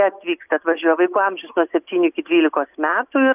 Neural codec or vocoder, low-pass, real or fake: none; 3.6 kHz; real